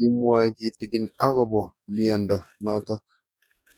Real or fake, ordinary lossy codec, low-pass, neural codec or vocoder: fake; none; none; codec, 44.1 kHz, 2.6 kbps, DAC